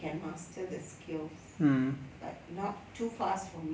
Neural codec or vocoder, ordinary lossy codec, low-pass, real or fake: none; none; none; real